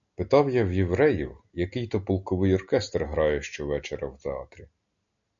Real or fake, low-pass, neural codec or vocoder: real; 7.2 kHz; none